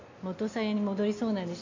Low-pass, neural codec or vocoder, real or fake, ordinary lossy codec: 7.2 kHz; none; real; MP3, 48 kbps